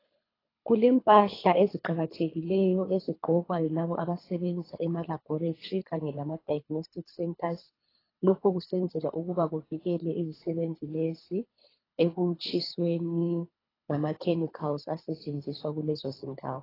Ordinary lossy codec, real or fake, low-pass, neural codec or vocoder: AAC, 24 kbps; fake; 5.4 kHz; codec, 24 kHz, 3 kbps, HILCodec